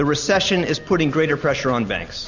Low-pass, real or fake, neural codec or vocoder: 7.2 kHz; real; none